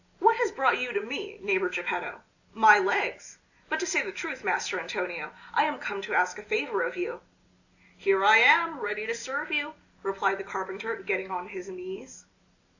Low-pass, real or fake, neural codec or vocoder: 7.2 kHz; real; none